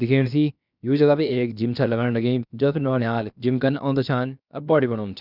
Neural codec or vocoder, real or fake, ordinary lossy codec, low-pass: codec, 16 kHz, 0.7 kbps, FocalCodec; fake; none; 5.4 kHz